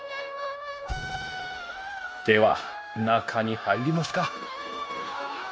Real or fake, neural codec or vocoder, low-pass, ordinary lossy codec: fake; codec, 16 kHz, 0.9 kbps, LongCat-Audio-Codec; none; none